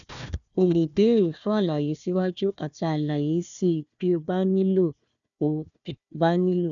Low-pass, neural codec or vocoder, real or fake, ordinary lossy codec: 7.2 kHz; codec, 16 kHz, 1 kbps, FunCodec, trained on Chinese and English, 50 frames a second; fake; none